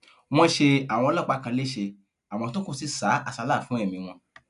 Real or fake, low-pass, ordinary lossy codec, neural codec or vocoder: real; 10.8 kHz; none; none